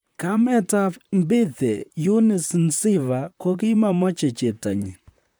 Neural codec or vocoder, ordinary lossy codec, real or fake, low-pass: vocoder, 44.1 kHz, 128 mel bands, Pupu-Vocoder; none; fake; none